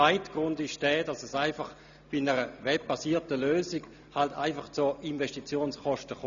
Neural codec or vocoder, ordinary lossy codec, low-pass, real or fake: none; none; 7.2 kHz; real